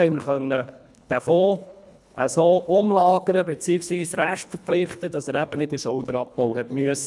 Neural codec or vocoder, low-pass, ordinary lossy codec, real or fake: codec, 24 kHz, 1.5 kbps, HILCodec; none; none; fake